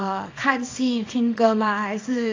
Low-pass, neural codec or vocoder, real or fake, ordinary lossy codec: 7.2 kHz; codec, 24 kHz, 0.9 kbps, WavTokenizer, small release; fake; AAC, 32 kbps